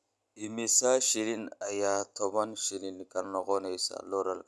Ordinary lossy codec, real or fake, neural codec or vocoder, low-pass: none; real; none; none